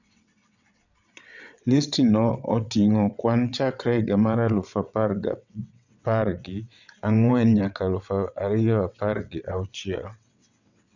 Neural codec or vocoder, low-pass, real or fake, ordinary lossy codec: vocoder, 22.05 kHz, 80 mel bands, WaveNeXt; 7.2 kHz; fake; none